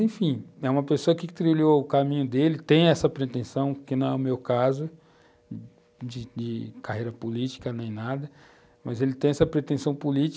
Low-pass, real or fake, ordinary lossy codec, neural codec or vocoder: none; real; none; none